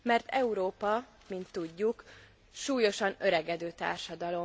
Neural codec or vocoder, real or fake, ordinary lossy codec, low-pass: none; real; none; none